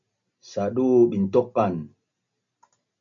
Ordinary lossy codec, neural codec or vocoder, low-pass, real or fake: MP3, 64 kbps; none; 7.2 kHz; real